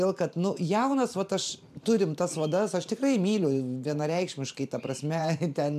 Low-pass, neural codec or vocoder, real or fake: 14.4 kHz; vocoder, 44.1 kHz, 128 mel bands every 512 samples, BigVGAN v2; fake